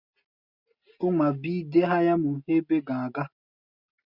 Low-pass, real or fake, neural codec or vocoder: 5.4 kHz; real; none